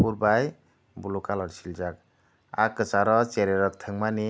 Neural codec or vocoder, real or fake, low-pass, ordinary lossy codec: none; real; none; none